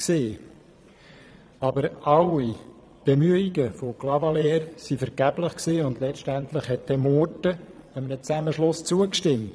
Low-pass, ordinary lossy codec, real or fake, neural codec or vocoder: none; none; fake; vocoder, 22.05 kHz, 80 mel bands, Vocos